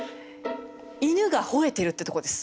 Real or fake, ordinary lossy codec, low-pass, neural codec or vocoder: real; none; none; none